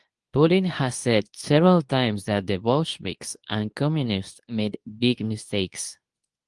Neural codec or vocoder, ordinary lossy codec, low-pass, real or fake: codec, 24 kHz, 0.9 kbps, WavTokenizer, medium speech release version 2; Opus, 24 kbps; 10.8 kHz; fake